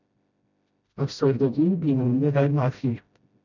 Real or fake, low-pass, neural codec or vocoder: fake; 7.2 kHz; codec, 16 kHz, 0.5 kbps, FreqCodec, smaller model